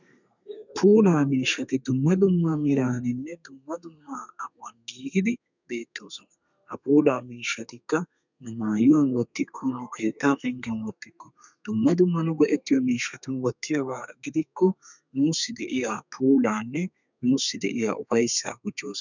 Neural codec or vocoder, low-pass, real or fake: codec, 32 kHz, 1.9 kbps, SNAC; 7.2 kHz; fake